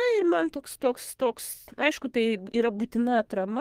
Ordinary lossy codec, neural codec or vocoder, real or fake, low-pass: Opus, 24 kbps; codec, 32 kHz, 1.9 kbps, SNAC; fake; 14.4 kHz